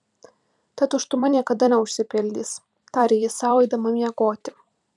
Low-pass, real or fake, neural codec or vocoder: 10.8 kHz; fake; vocoder, 44.1 kHz, 128 mel bands every 256 samples, BigVGAN v2